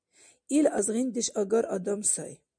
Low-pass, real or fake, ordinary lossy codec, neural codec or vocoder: 9.9 kHz; real; MP3, 96 kbps; none